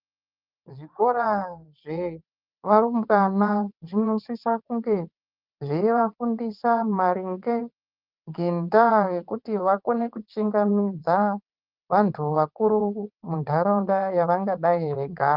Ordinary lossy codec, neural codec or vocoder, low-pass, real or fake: Opus, 24 kbps; vocoder, 22.05 kHz, 80 mel bands, WaveNeXt; 5.4 kHz; fake